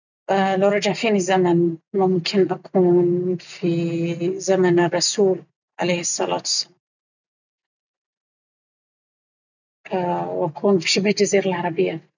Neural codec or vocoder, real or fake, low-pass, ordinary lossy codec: none; real; 7.2 kHz; none